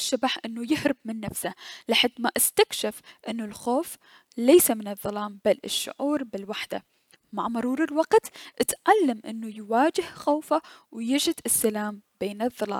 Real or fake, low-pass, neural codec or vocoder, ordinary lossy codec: real; 19.8 kHz; none; none